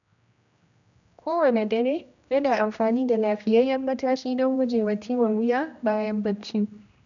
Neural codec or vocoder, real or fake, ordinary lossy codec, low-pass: codec, 16 kHz, 1 kbps, X-Codec, HuBERT features, trained on general audio; fake; none; 7.2 kHz